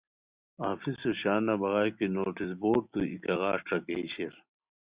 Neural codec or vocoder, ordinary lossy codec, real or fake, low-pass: none; Opus, 64 kbps; real; 3.6 kHz